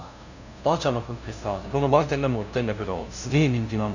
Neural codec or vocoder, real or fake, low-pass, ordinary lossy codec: codec, 16 kHz, 0.5 kbps, FunCodec, trained on LibriTTS, 25 frames a second; fake; 7.2 kHz; none